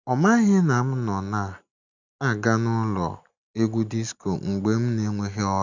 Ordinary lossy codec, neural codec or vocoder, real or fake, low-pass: none; none; real; 7.2 kHz